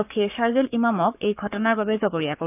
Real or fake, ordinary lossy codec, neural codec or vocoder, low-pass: fake; none; codec, 44.1 kHz, 7.8 kbps, Pupu-Codec; 3.6 kHz